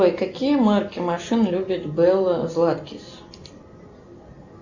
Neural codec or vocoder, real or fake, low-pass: none; real; 7.2 kHz